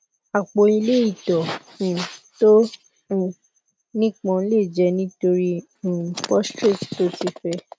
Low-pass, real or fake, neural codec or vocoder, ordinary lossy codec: none; real; none; none